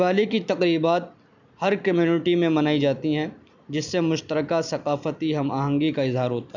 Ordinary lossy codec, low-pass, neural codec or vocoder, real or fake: none; 7.2 kHz; none; real